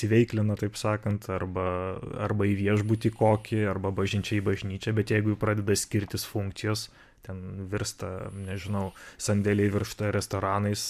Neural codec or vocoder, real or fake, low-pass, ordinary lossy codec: none; real; 14.4 kHz; MP3, 96 kbps